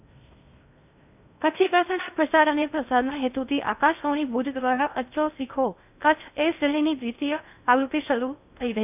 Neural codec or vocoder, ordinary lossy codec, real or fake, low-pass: codec, 16 kHz in and 24 kHz out, 0.6 kbps, FocalCodec, streaming, 2048 codes; none; fake; 3.6 kHz